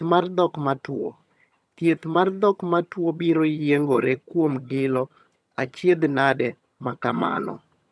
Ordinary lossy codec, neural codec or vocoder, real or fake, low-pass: none; vocoder, 22.05 kHz, 80 mel bands, HiFi-GAN; fake; none